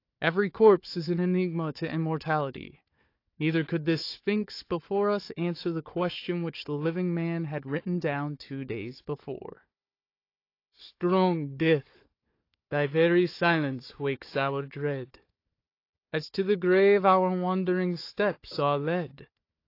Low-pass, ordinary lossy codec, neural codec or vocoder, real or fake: 5.4 kHz; AAC, 32 kbps; codec, 16 kHz, 4 kbps, FunCodec, trained on Chinese and English, 50 frames a second; fake